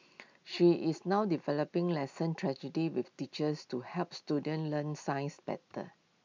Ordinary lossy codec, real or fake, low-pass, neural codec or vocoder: MP3, 64 kbps; real; 7.2 kHz; none